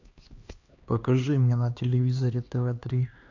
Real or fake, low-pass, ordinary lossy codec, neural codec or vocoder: fake; 7.2 kHz; AAC, 48 kbps; codec, 16 kHz, 2 kbps, X-Codec, HuBERT features, trained on LibriSpeech